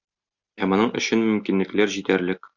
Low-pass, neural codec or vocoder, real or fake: 7.2 kHz; none; real